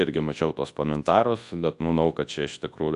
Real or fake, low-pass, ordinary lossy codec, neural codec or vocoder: fake; 10.8 kHz; AAC, 64 kbps; codec, 24 kHz, 0.9 kbps, WavTokenizer, large speech release